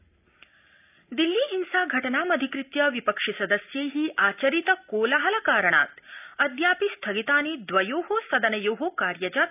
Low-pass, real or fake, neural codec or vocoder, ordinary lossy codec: 3.6 kHz; real; none; none